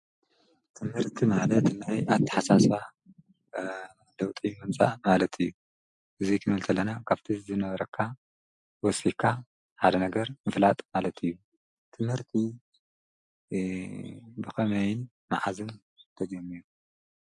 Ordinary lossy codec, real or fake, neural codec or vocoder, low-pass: MP3, 64 kbps; real; none; 10.8 kHz